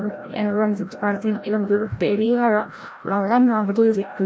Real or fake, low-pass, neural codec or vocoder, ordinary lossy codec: fake; none; codec, 16 kHz, 0.5 kbps, FreqCodec, larger model; none